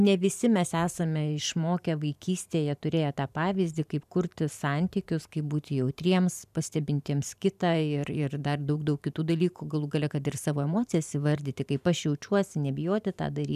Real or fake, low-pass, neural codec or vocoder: fake; 14.4 kHz; vocoder, 44.1 kHz, 128 mel bands every 512 samples, BigVGAN v2